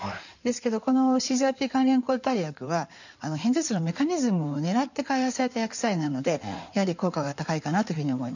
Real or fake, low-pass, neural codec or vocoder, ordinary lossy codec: fake; 7.2 kHz; codec, 16 kHz in and 24 kHz out, 2.2 kbps, FireRedTTS-2 codec; none